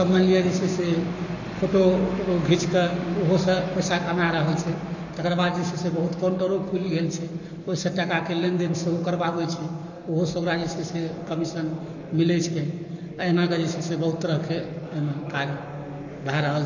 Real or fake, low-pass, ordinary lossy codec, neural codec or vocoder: fake; 7.2 kHz; none; codec, 44.1 kHz, 7.8 kbps, Pupu-Codec